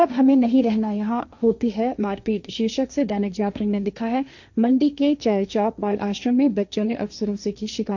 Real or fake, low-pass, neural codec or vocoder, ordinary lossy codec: fake; 7.2 kHz; codec, 16 kHz, 1.1 kbps, Voila-Tokenizer; none